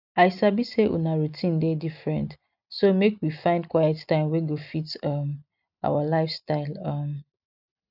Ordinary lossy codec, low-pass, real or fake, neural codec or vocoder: none; 5.4 kHz; real; none